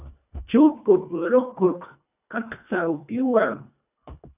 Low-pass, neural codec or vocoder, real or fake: 3.6 kHz; codec, 24 kHz, 1.5 kbps, HILCodec; fake